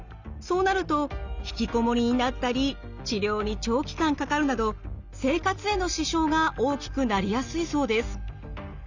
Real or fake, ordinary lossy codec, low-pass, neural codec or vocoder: real; Opus, 64 kbps; 7.2 kHz; none